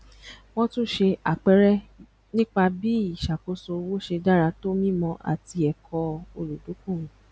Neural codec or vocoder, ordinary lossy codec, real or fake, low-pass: none; none; real; none